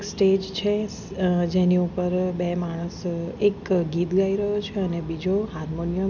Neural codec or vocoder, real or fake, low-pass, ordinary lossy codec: none; real; 7.2 kHz; none